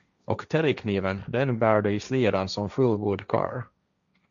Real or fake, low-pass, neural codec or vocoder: fake; 7.2 kHz; codec, 16 kHz, 1.1 kbps, Voila-Tokenizer